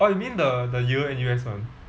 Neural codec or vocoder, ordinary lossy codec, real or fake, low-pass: none; none; real; none